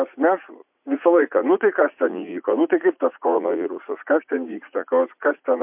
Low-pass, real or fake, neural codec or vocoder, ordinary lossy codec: 3.6 kHz; fake; vocoder, 44.1 kHz, 80 mel bands, Vocos; MP3, 32 kbps